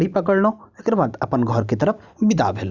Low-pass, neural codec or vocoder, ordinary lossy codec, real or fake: 7.2 kHz; vocoder, 44.1 kHz, 128 mel bands every 512 samples, BigVGAN v2; Opus, 64 kbps; fake